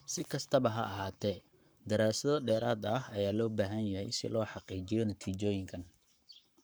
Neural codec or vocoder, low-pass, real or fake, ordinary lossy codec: codec, 44.1 kHz, 7.8 kbps, Pupu-Codec; none; fake; none